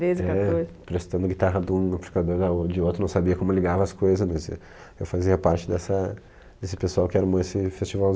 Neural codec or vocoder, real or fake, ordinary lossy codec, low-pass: none; real; none; none